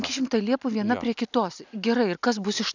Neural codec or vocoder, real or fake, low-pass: none; real; 7.2 kHz